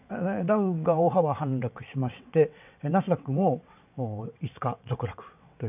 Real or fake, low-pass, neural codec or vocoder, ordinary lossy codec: fake; 3.6 kHz; vocoder, 22.05 kHz, 80 mel bands, WaveNeXt; none